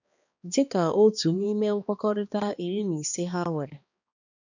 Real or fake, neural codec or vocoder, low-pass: fake; codec, 16 kHz, 2 kbps, X-Codec, HuBERT features, trained on balanced general audio; 7.2 kHz